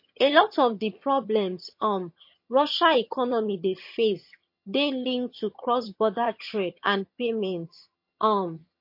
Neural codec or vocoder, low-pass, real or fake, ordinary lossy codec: vocoder, 22.05 kHz, 80 mel bands, HiFi-GAN; 5.4 kHz; fake; MP3, 32 kbps